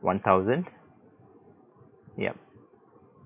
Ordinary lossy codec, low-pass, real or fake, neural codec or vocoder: none; 3.6 kHz; real; none